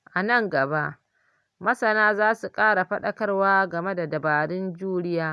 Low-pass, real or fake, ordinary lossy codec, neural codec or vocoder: none; real; none; none